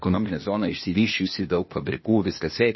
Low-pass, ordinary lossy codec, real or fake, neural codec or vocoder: 7.2 kHz; MP3, 24 kbps; fake; codec, 16 kHz, 0.8 kbps, ZipCodec